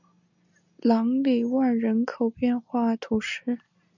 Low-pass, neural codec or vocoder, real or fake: 7.2 kHz; none; real